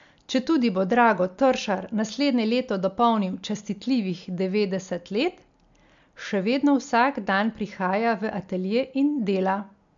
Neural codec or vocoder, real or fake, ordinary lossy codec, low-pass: none; real; MP3, 64 kbps; 7.2 kHz